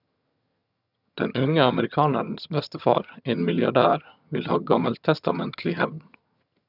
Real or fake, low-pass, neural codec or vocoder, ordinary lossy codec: fake; 5.4 kHz; vocoder, 22.05 kHz, 80 mel bands, HiFi-GAN; none